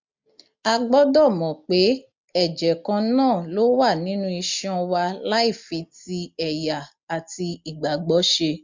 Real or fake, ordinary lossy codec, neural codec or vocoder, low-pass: real; MP3, 64 kbps; none; 7.2 kHz